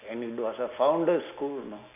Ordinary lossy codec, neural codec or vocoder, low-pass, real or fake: none; none; 3.6 kHz; real